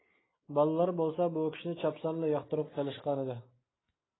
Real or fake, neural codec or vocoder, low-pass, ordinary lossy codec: real; none; 7.2 kHz; AAC, 16 kbps